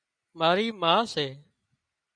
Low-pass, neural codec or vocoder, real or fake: 9.9 kHz; none; real